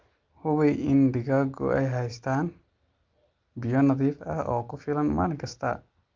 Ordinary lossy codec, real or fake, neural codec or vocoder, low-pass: Opus, 24 kbps; real; none; 7.2 kHz